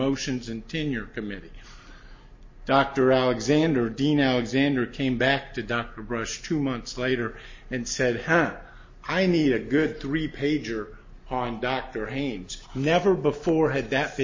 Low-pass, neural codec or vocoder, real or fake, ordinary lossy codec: 7.2 kHz; none; real; MP3, 32 kbps